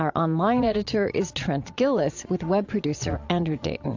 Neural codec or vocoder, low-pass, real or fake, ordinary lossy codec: vocoder, 22.05 kHz, 80 mel bands, Vocos; 7.2 kHz; fake; AAC, 48 kbps